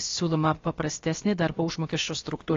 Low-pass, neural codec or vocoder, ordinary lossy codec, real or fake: 7.2 kHz; codec, 16 kHz, about 1 kbps, DyCAST, with the encoder's durations; AAC, 32 kbps; fake